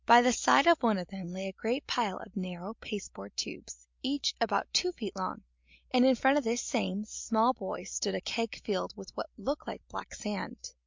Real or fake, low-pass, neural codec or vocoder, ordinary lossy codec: real; 7.2 kHz; none; MP3, 64 kbps